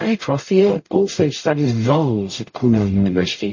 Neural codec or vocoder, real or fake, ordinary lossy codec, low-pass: codec, 44.1 kHz, 0.9 kbps, DAC; fake; MP3, 32 kbps; 7.2 kHz